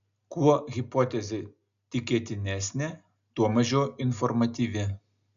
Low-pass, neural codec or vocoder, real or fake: 7.2 kHz; none; real